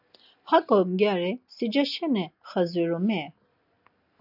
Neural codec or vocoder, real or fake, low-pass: none; real; 5.4 kHz